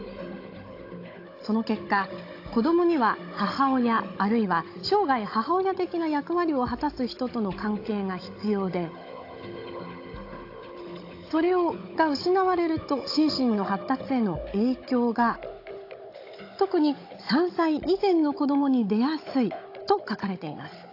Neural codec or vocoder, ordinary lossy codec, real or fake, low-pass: codec, 16 kHz, 16 kbps, FunCodec, trained on Chinese and English, 50 frames a second; AAC, 32 kbps; fake; 5.4 kHz